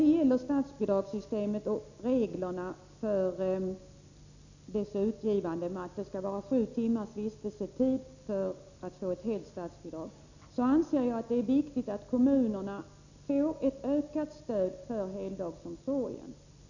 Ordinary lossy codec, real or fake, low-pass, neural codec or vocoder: none; real; 7.2 kHz; none